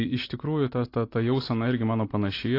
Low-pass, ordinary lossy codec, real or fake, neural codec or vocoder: 5.4 kHz; AAC, 32 kbps; real; none